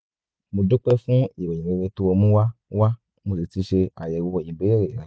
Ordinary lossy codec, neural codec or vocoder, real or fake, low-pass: none; none; real; none